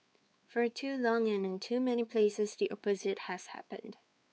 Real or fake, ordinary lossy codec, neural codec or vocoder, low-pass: fake; none; codec, 16 kHz, 4 kbps, X-Codec, WavLM features, trained on Multilingual LibriSpeech; none